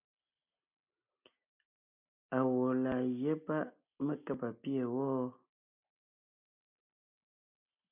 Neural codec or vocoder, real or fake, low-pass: none; real; 3.6 kHz